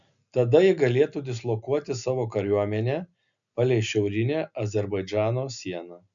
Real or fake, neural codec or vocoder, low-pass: real; none; 7.2 kHz